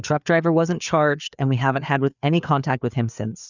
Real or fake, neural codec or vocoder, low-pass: fake; codec, 16 kHz, 4 kbps, FreqCodec, larger model; 7.2 kHz